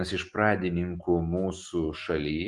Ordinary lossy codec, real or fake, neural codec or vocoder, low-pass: Opus, 24 kbps; real; none; 10.8 kHz